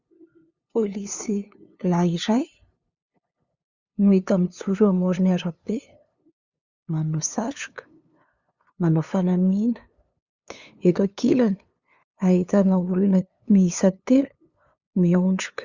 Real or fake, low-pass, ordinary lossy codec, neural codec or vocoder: fake; 7.2 kHz; Opus, 64 kbps; codec, 16 kHz, 2 kbps, FunCodec, trained on LibriTTS, 25 frames a second